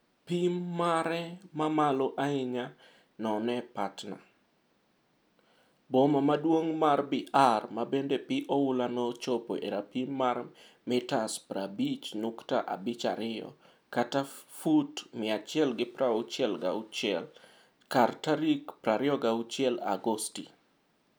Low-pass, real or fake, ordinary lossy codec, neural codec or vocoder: none; real; none; none